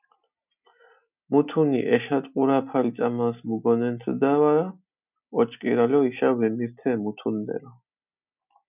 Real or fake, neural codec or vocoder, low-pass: real; none; 3.6 kHz